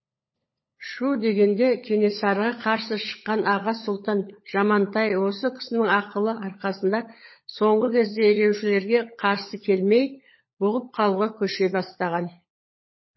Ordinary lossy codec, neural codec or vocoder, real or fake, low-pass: MP3, 24 kbps; codec, 16 kHz, 16 kbps, FunCodec, trained on LibriTTS, 50 frames a second; fake; 7.2 kHz